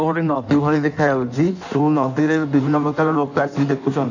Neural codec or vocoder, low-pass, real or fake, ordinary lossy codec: codec, 16 kHz in and 24 kHz out, 1.1 kbps, FireRedTTS-2 codec; 7.2 kHz; fake; none